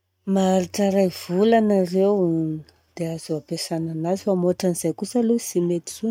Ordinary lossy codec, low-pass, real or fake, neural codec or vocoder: none; 19.8 kHz; real; none